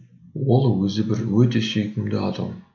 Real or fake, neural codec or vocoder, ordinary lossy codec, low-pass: real; none; none; 7.2 kHz